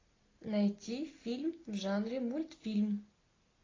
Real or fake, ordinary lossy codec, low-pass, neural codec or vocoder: real; AAC, 32 kbps; 7.2 kHz; none